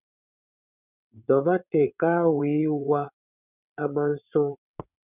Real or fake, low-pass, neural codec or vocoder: fake; 3.6 kHz; vocoder, 22.05 kHz, 80 mel bands, WaveNeXt